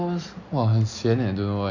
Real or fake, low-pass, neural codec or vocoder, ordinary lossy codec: real; 7.2 kHz; none; none